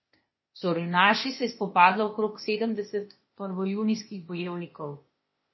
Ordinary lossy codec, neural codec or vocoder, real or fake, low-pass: MP3, 24 kbps; codec, 16 kHz, 0.8 kbps, ZipCodec; fake; 7.2 kHz